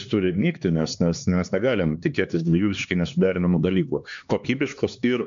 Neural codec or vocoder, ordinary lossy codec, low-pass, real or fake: codec, 16 kHz, 2 kbps, X-Codec, HuBERT features, trained on LibriSpeech; MP3, 64 kbps; 7.2 kHz; fake